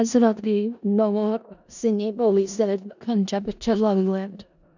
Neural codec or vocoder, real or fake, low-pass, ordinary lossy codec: codec, 16 kHz in and 24 kHz out, 0.4 kbps, LongCat-Audio-Codec, four codebook decoder; fake; 7.2 kHz; none